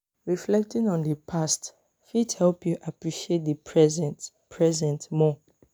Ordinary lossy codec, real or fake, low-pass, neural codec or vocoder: none; real; none; none